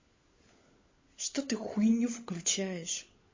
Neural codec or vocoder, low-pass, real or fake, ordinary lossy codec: codec, 16 kHz, 16 kbps, FunCodec, trained on LibriTTS, 50 frames a second; 7.2 kHz; fake; MP3, 32 kbps